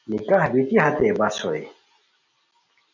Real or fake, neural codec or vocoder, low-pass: real; none; 7.2 kHz